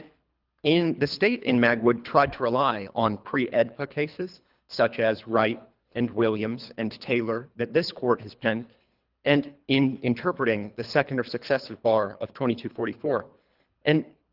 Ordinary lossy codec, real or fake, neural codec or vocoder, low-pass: Opus, 32 kbps; fake; codec, 24 kHz, 3 kbps, HILCodec; 5.4 kHz